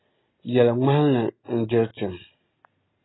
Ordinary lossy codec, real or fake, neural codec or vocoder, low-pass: AAC, 16 kbps; real; none; 7.2 kHz